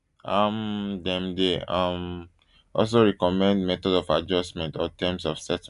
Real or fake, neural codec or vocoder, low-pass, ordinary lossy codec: real; none; 10.8 kHz; none